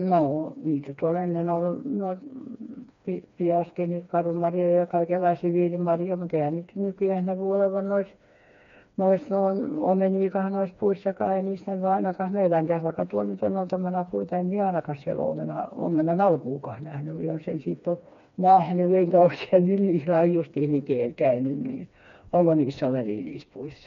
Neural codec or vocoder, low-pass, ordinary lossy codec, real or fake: codec, 16 kHz, 2 kbps, FreqCodec, smaller model; 7.2 kHz; MP3, 48 kbps; fake